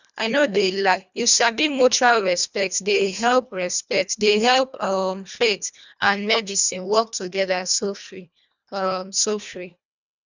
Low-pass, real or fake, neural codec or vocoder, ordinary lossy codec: 7.2 kHz; fake; codec, 24 kHz, 1.5 kbps, HILCodec; none